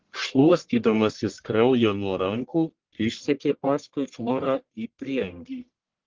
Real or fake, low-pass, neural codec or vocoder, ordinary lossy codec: fake; 7.2 kHz; codec, 44.1 kHz, 1.7 kbps, Pupu-Codec; Opus, 16 kbps